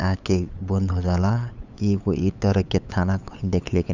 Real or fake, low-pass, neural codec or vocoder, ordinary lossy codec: fake; 7.2 kHz; codec, 16 kHz, 8 kbps, FunCodec, trained on LibriTTS, 25 frames a second; none